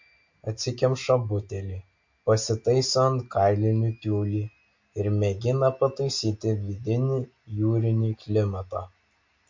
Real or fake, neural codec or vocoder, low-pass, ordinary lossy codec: real; none; 7.2 kHz; MP3, 48 kbps